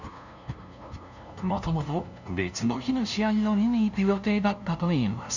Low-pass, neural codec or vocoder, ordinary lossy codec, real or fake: 7.2 kHz; codec, 16 kHz, 0.5 kbps, FunCodec, trained on LibriTTS, 25 frames a second; none; fake